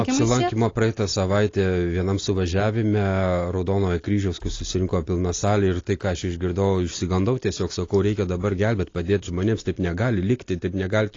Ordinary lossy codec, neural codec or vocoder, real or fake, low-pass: AAC, 32 kbps; none; real; 7.2 kHz